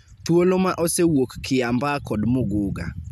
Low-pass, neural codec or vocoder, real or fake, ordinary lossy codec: 14.4 kHz; none; real; none